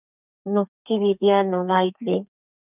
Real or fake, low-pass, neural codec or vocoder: fake; 3.6 kHz; codec, 32 kHz, 1.9 kbps, SNAC